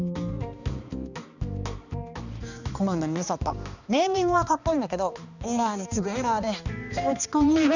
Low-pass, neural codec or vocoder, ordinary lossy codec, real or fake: 7.2 kHz; codec, 16 kHz, 2 kbps, X-Codec, HuBERT features, trained on balanced general audio; none; fake